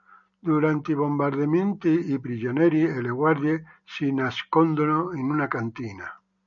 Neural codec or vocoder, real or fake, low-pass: none; real; 7.2 kHz